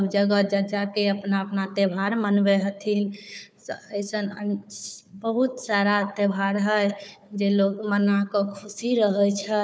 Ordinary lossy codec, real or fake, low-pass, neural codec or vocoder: none; fake; none; codec, 16 kHz, 4 kbps, FunCodec, trained on Chinese and English, 50 frames a second